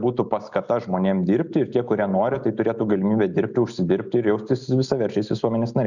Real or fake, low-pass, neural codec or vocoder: real; 7.2 kHz; none